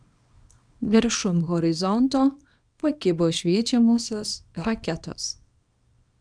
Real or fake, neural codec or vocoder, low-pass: fake; codec, 24 kHz, 0.9 kbps, WavTokenizer, small release; 9.9 kHz